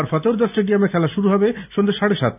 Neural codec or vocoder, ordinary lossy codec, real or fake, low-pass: none; none; real; 3.6 kHz